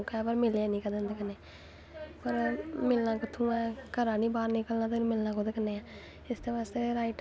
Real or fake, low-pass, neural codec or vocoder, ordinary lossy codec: real; none; none; none